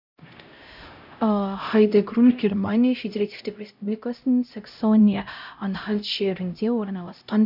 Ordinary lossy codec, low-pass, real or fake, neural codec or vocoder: none; 5.4 kHz; fake; codec, 16 kHz, 0.5 kbps, X-Codec, HuBERT features, trained on LibriSpeech